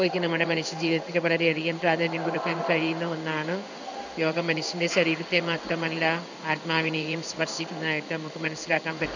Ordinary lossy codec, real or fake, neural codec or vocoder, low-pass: none; fake; codec, 16 kHz in and 24 kHz out, 1 kbps, XY-Tokenizer; 7.2 kHz